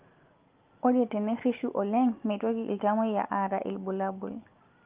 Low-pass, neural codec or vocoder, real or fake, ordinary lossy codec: 3.6 kHz; none; real; Opus, 32 kbps